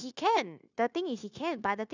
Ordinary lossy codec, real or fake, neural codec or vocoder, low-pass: none; fake; codec, 16 kHz, 0.9 kbps, LongCat-Audio-Codec; 7.2 kHz